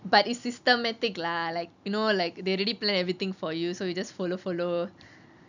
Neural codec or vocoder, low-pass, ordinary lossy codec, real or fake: none; 7.2 kHz; none; real